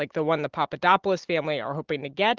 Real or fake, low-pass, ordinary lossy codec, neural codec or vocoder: real; 7.2 kHz; Opus, 16 kbps; none